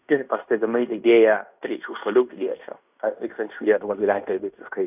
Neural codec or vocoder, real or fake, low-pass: codec, 16 kHz in and 24 kHz out, 0.9 kbps, LongCat-Audio-Codec, fine tuned four codebook decoder; fake; 3.6 kHz